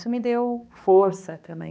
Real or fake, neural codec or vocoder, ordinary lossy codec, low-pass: fake; codec, 16 kHz, 2 kbps, X-Codec, HuBERT features, trained on balanced general audio; none; none